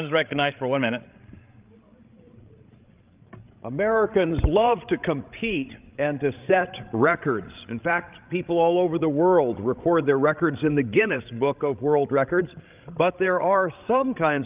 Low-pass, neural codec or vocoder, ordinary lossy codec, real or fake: 3.6 kHz; codec, 16 kHz, 16 kbps, FreqCodec, larger model; Opus, 24 kbps; fake